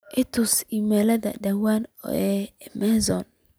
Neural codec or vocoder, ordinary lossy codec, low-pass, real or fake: none; none; none; real